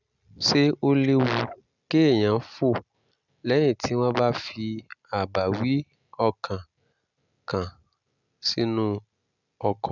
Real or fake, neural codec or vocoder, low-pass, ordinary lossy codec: real; none; 7.2 kHz; none